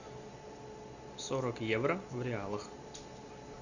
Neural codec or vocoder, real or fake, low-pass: none; real; 7.2 kHz